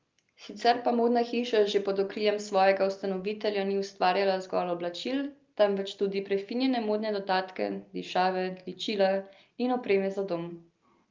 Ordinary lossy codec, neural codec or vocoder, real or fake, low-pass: Opus, 32 kbps; none; real; 7.2 kHz